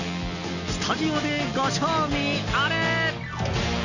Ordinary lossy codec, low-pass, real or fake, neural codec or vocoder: none; 7.2 kHz; real; none